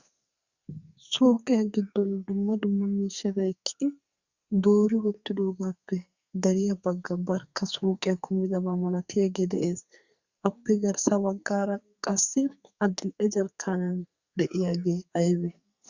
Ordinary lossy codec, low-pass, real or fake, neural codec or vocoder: Opus, 64 kbps; 7.2 kHz; fake; codec, 44.1 kHz, 2.6 kbps, SNAC